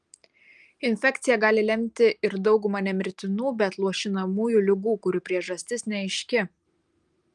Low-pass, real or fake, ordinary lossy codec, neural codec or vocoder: 10.8 kHz; real; Opus, 32 kbps; none